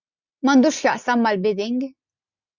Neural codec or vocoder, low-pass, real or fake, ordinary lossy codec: none; 7.2 kHz; real; Opus, 64 kbps